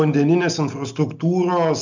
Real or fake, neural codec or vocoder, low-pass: real; none; 7.2 kHz